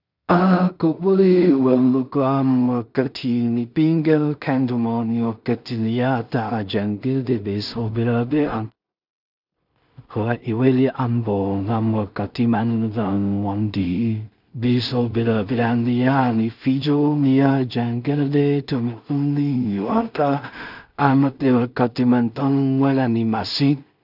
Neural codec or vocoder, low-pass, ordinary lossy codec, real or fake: codec, 16 kHz in and 24 kHz out, 0.4 kbps, LongCat-Audio-Codec, two codebook decoder; 5.4 kHz; none; fake